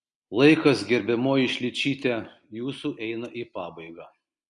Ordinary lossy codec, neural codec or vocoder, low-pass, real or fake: Opus, 64 kbps; none; 10.8 kHz; real